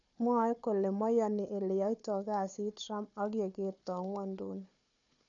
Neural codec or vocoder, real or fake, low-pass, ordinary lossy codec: codec, 16 kHz, 4 kbps, FunCodec, trained on Chinese and English, 50 frames a second; fake; 7.2 kHz; none